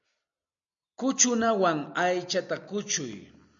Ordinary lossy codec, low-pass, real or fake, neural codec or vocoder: MP3, 64 kbps; 7.2 kHz; real; none